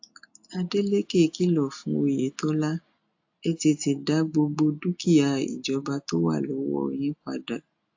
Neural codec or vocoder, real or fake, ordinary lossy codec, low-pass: none; real; AAC, 48 kbps; 7.2 kHz